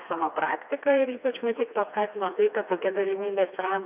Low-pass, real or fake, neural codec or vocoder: 3.6 kHz; fake; codec, 16 kHz, 2 kbps, FreqCodec, smaller model